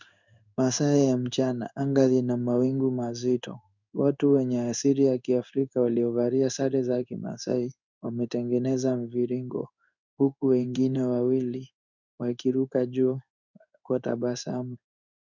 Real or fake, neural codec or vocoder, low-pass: fake; codec, 16 kHz in and 24 kHz out, 1 kbps, XY-Tokenizer; 7.2 kHz